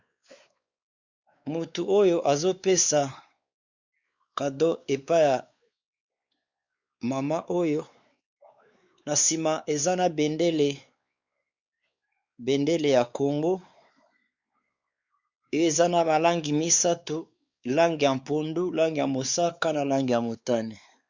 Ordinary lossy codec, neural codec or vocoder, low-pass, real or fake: Opus, 64 kbps; codec, 16 kHz, 4 kbps, X-Codec, WavLM features, trained on Multilingual LibriSpeech; 7.2 kHz; fake